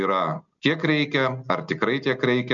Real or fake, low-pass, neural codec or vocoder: real; 7.2 kHz; none